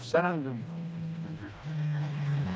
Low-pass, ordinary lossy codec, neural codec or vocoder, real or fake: none; none; codec, 16 kHz, 1 kbps, FreqCodec, smaller model; fake